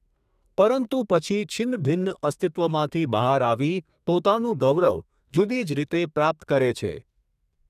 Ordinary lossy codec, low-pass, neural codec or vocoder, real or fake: none; 14.4 kHz; codec, 32 kHz, 1.9 kbps, SNAC; fake